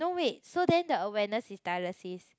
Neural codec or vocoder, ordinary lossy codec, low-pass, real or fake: none; none; none; real